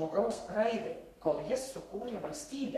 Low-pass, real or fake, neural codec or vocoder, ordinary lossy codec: 14.4 kHz; fake; codec, 32 kHz, 1.9 kbps, SNAC; MP3, 64 kbps